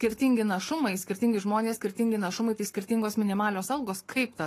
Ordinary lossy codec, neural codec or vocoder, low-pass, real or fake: AAC, 48 kbps; codec, 44.1 kHz, 7.8 kbps, Pupu-Codec; 14.4 kHz; fake